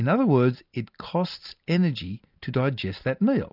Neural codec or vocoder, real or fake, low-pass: none; real; 5.4 kHz